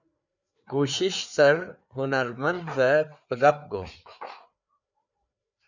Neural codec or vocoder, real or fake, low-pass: codec, 16 kHz, 4 kbps, FreqCodec, larger model; fake; 7.2 kHz